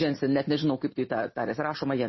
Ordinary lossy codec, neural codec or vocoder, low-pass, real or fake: MP3, 24 kbps; none; 7.2 kHz; real